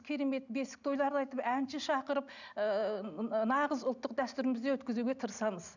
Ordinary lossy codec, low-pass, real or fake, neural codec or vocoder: none; 7.2 kHz; real; none